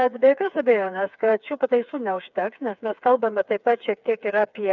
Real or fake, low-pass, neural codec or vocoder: fake; 7.2 kHz; codec, 16 kHz, 4 kbps, FreqCodec, smaller model